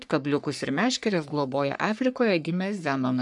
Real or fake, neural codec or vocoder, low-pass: fake; codec, 44.1 kHz, 3.4 kbps, Pupu-Codec; 10.8 kHz